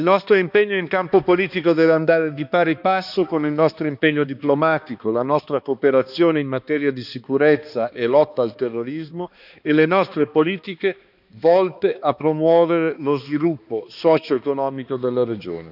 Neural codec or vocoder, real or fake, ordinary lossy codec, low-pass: codec, 16 kHz, 2 kbps, X-Codec, HuBERT features, trained on balanced general audio; fake; none; 5.4 kHz